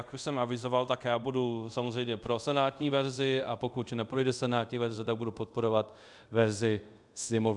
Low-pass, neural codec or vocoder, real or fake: 10.8 kHz; codec, 24 kHz, 0.5 kbps, DualCodec; fake